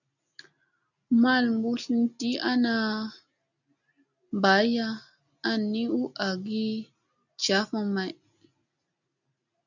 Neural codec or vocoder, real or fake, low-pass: none; real; 7.2 kHz